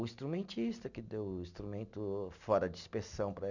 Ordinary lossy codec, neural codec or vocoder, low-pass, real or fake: none; none; 7.2 kHz; real